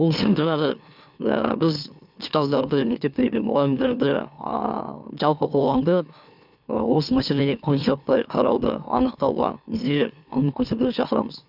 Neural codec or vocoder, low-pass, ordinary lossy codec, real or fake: autoencoder, 44.1 kHz, a latent of 192 numbers a frame, MeloTTS; 5.4 kHz; none; fake